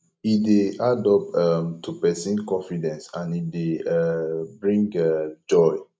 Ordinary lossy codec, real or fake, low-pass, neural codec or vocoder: none; real; none; none